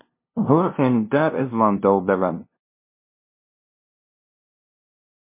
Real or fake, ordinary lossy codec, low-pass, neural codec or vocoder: fake; MP3, 24 kbps; 3.6 kHz; codec, 16 kHz, 0.5 kbps, FunCodec, trained on LibriTTS, 25 frames a second